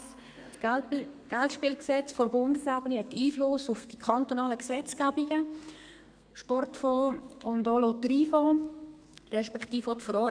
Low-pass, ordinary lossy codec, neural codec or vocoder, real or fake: 9.9 kHz; none; codec, 32 kHz, 1.9 kbps, SNAC; fake